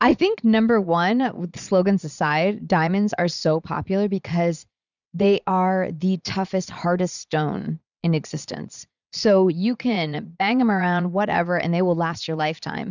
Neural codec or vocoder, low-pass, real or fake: vocoder, 22.05 kHz, 80 mel bands, Vocos; 7.2 kHz; fake